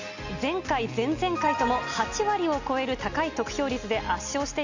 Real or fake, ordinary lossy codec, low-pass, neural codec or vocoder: real; Opus, 64 kbps; 7.2 kHz; none